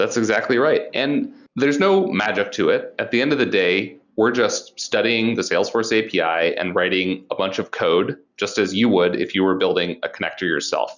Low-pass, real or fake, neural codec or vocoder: 7.2 kHz; real; none